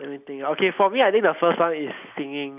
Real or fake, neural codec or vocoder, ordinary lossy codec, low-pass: real; none; none; 3.6 kHz